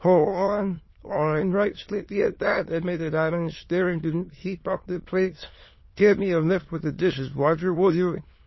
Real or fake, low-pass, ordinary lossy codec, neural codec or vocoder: fake; 7.2 kHz; MP3, 24 kbps; autoencoder, 22.05 kHz, a latent of 192 numbers a frame, VITS, trained on many speakers